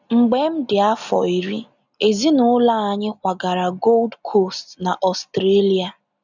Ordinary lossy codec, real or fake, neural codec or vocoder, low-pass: none; real; none; 7.2 kHz